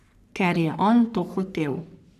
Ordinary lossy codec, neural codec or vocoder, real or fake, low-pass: none; codec, 44.1 kHz, 3.4 kbps, Pupu-Codec; fake; 14.4 kHz